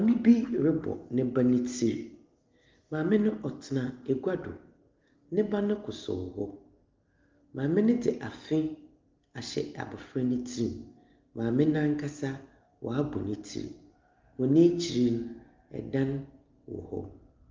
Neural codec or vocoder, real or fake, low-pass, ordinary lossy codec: none; real; 7.2 kHz; Opus, 32 kbps